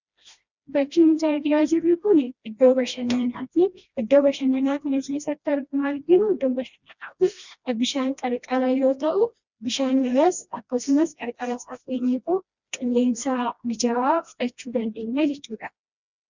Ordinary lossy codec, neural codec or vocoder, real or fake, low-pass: Opus, 64 kbps; codec, 16 kHz, 1 kbps, FreqCodec, smaller model; fake; 7.2 kHz